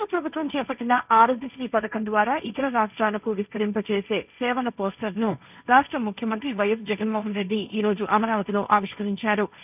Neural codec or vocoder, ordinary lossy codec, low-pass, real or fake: codec, 16 kHz, 1.1 kbps, Voila-Tokenizer; none; 3.6 kHz; fake